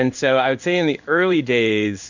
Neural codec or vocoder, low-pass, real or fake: codec, 16 kHz in and 24 kHz out, 1 kbps, XY-Tokenizer; 7.2 kHz; fake